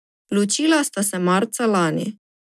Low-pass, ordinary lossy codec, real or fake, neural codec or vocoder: none; none; real; none